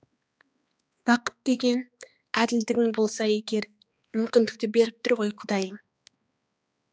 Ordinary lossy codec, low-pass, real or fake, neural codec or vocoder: none; none; fake; codec, 16 kHz, 2 kbps, X-Codec, HuBERT features, trained on balanced general audio